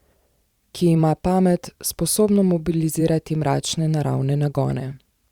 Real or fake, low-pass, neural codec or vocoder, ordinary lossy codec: fake; 19.8 kHz; vocoder, 44.1 kHz, 128 mel bands every 512 samples, BigVGAN v2; Opus, 64 kbps